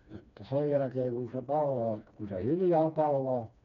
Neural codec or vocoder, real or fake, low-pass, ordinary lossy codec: codec, 16 kHz, 2 kbps, FreqCodec, smaller model; fake; 7.2 kHz; none